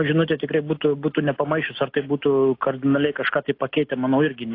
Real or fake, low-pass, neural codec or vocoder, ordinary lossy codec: real; 5.4 kHz; none; AAC, 32 kbps